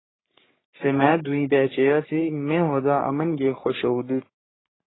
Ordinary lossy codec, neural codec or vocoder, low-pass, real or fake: AAC, 16 kbps; codec, 44.1 kHz, 3.4 kbps, Pupu-Codec; 7.2 kHz; fake